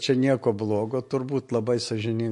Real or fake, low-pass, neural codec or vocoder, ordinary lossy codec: real; 10.8 kHz; none; MP3, 48 kbps